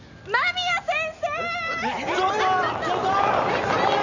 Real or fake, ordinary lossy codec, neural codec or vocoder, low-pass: real; none; none; 7.2 kHz